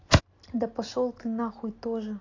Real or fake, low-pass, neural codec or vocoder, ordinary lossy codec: real; 7.2 kHz; none; AAC, 32 kbps